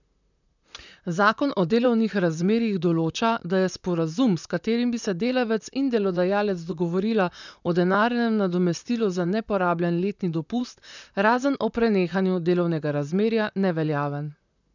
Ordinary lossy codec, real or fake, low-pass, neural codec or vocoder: none; fake; 7.2 kHz; vocoder, 44.1 kHz, 128 mel bands, Pupu-Vocoder